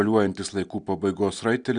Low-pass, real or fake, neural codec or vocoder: 9.9 kHz; real; none